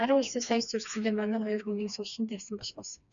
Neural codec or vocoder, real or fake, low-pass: codec, 16 kHz, 2 kbps, FreqCodec, smaller model; fake; 7.2 kHz